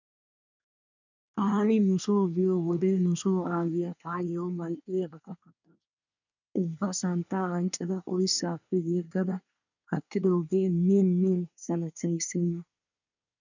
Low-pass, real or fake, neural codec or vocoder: 7.2 kHz; fake; codec, 24 kHz, 1 kbps, SNAC